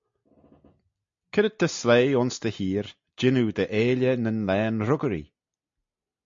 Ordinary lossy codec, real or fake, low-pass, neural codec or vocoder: MP3, 48 kbps; real; 7.2 kHz; none